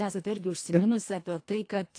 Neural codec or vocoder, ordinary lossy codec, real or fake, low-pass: codec, 24 kHz, 1.5 kbps, HILCodec; AAC, 48 kbps; fake; 9.9 kHz